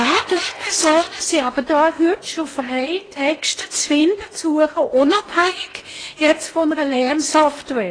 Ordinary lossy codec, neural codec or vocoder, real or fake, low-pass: AAC, 32 kbps; codec, 16 kHz in and 24 kHz out, 0.8 kbps, FocalCodec, streaming, 65536 codes; fake; 9.9 kHz